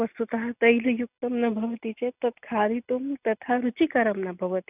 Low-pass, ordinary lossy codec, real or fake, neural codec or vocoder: 3.6 kHz; none; real; none